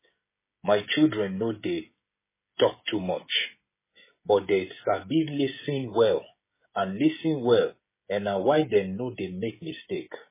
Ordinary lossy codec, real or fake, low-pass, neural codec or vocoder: MP3, 16 kbps; fake; 3.6 kHz; codec, 16 kHz, 16 kbps, FreqCodec, smaller model